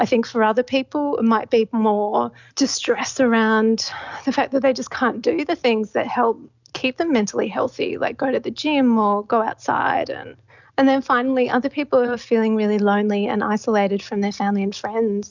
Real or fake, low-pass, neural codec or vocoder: real; 7.2 kHz; none